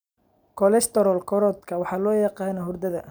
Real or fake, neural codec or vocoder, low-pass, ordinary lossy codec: real; none; none; none